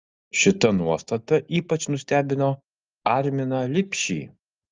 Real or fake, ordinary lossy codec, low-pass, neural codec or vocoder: real; Opus, 32 kbps; 7.2 kHz; none